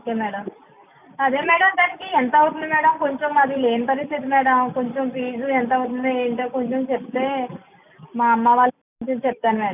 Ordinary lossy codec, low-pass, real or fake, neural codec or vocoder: none; 3.6 kHz; real; none